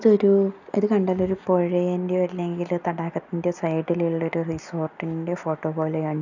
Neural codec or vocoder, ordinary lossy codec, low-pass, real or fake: none; none; 7.2 kHz; real